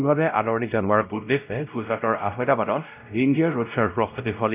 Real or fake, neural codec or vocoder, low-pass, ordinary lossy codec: fake; codec, 16 kHz, 0.5 kbps, X-Codec, WavLM features, trained on Multilingual LibriSpeech; 3.6 kHz; none